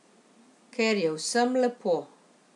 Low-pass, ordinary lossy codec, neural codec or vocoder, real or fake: 10.8 kHz; none; none; real